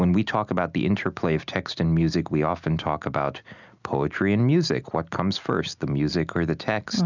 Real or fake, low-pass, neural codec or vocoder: real; 7.2 kHz; none